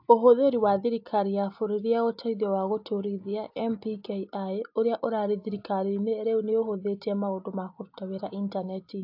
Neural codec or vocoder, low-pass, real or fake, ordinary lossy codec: none; 5.4 kHz; real; AAC, 48 kbps